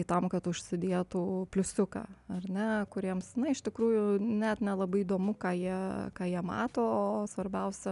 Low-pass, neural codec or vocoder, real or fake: 10.8 kHz; none; real